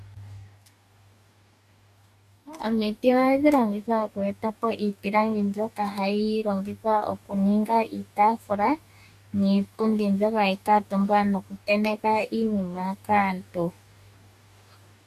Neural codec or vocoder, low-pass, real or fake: codec, 44.1 kHz, 2.6 kbps, DAC; 14.4 kHz; fake